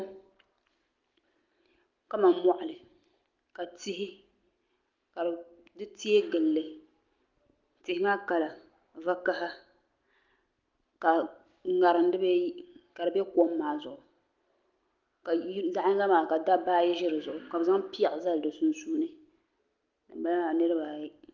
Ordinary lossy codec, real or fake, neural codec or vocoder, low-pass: Opus, 32 kbps; real; none; 7.2 kHz